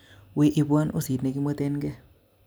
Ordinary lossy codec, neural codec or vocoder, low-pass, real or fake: none; none; none; real